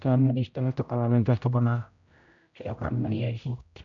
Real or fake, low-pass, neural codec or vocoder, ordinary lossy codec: fake; 7.2 kHz; codec, 16 kHz, 0.5 kbps, X-Codec, HuBERT features, trained on general audio; AAC, 64 kbps